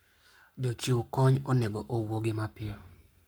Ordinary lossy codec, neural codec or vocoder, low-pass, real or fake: none; codec, 44.1 kHz, 7.8 kbps, Pupu-Codec; none; fake